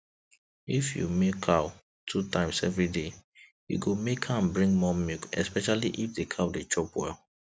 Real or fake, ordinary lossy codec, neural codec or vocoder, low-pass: real; none; none; none